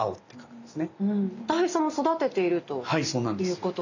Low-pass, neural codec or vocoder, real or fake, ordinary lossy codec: 7.2 kHz; none; real; none